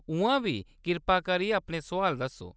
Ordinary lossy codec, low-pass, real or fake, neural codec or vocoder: none; none; real; none